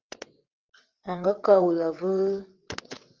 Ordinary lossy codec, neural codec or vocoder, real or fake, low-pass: Opus, 24 kbps; vocoder, 22.05 kHz, 80 mel bands, WaveNeXt; fake; 7.2 kHz